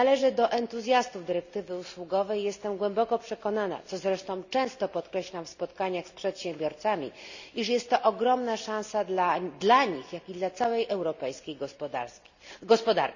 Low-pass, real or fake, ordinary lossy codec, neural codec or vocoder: 7.2 kHz; real; none; none